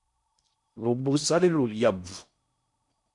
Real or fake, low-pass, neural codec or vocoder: fake; 10.8 kHz; codec, 16 kHz in and 24 kHz out, 0.6 kbps, FocalCodec, streaming, 4096 codes